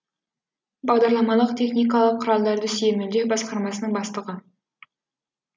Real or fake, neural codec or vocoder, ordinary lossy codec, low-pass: real; none; none; none